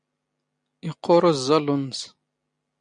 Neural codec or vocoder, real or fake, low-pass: none; real; 9.9 kHz